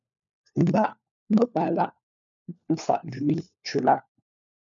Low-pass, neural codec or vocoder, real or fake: 7.2 kHz; codec, 16 kHz, 4 kbps, FunCodec, trained on LibriTTS, 50 frames a second; fake